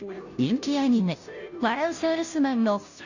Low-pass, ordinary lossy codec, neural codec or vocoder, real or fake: 7.2 kHz; none; codec, 16 kHz, 0.5 kbps, FunCodec, trained on Chinese and English, 25 frames a second; fake